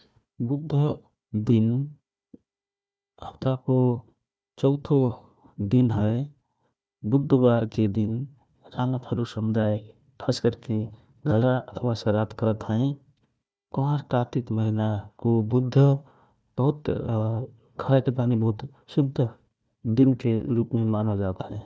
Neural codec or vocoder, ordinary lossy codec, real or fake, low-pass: codec, 16 kHz, 1 kbps, FunCodec, trained on Chinese and English, 50 frames a second; none; fake; none